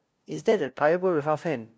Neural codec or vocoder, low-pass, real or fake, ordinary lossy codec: codec, 16 kHz, 0.5 kbps, FunCodec, trained on LibriTTS, 25 frames a second; none; fake; none